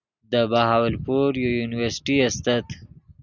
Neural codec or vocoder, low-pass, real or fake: none; 7.2 kHz; real